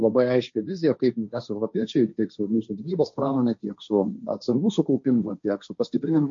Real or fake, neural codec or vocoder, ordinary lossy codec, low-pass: fake; codec, 16 kHz, 1.1 kbps, Voila-Tokenizer; MP3, 48 kbps; 7.2 kHz